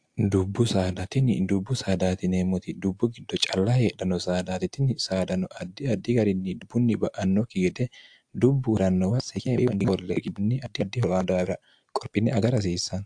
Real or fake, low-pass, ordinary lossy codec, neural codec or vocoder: real; 9.9 kHz; AAC, 64 kbps; none